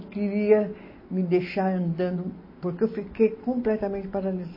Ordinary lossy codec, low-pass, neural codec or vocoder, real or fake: MP3, 24 kbps; 5.4 kHz; none; real